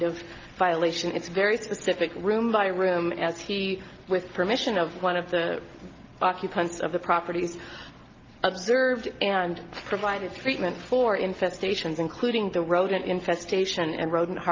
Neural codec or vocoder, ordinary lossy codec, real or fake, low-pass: none; Opus, 24 kbps; real; 7.2 kHz